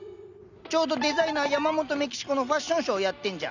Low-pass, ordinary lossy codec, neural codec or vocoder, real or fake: 7.2 kHz; none; none; real